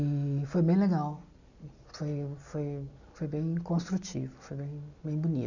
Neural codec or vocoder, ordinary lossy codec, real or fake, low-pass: none; none; real; 7.2 kHz